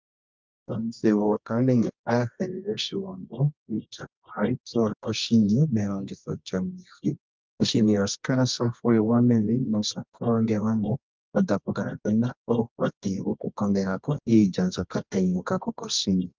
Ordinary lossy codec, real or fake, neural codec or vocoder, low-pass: Opus, 24 kbps; fake; codec, 24 kHz, 0.9 kbps, WavTokenizer, medium music audio release; 7.2 kHz